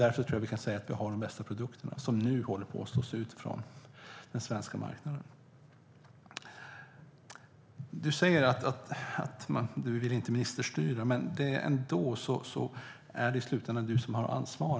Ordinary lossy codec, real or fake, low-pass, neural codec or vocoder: none; real; none; none